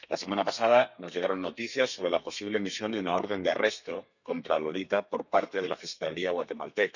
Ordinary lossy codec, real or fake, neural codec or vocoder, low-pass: none; fake; codec, 32 kHz, 1.9 kbps, SNAC; 7.2 kHz